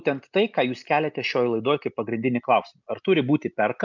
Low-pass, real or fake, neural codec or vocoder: 7.2 kHz; real; none